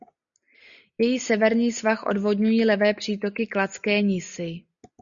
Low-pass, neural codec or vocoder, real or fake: 7.2 kHz; none; real